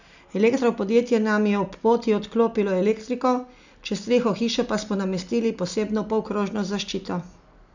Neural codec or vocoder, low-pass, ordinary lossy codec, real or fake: none; 7.2 kHz; none; real